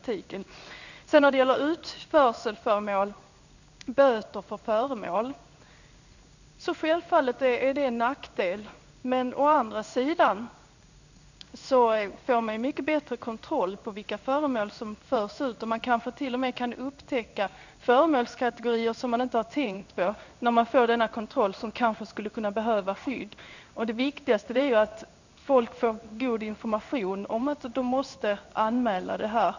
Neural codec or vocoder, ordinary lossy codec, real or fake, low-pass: codec, 16 kHz in and 24 kHz out, 1 kbps, XY-Tokenizer; none; fake; 7.2 kHz